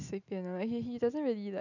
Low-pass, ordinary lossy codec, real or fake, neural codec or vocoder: 7.2 kHz; none; real; none